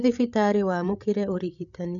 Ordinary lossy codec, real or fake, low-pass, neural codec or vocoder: none; fake; 7.2 kHz; codec, 16 kHz, 8 kbps, FreqCodec, larger model